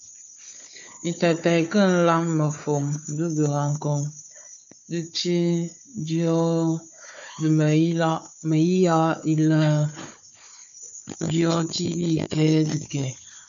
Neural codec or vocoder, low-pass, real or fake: codec, 16 kHz, 4 kbps, FunCodec, trained on Chinese and English, 50 frames a second; 7.2 kHz; fake